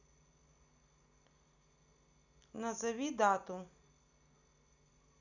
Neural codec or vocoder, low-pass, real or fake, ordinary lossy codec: none; 7.2 kHz; real; none